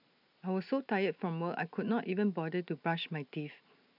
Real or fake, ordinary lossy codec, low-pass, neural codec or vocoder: real; none; 5.4 kHz; none